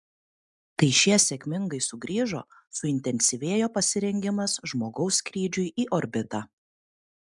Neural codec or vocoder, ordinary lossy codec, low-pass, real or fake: none; MP3, 96 kbps; 10.8 kHz; real